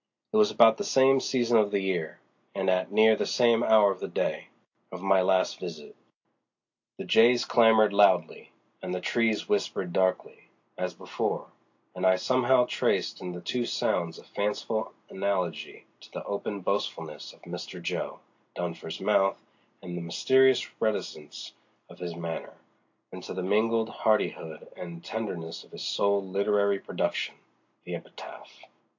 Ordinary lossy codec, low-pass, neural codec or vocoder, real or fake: AAC, 48 kbps; 7.2 kHz; none; real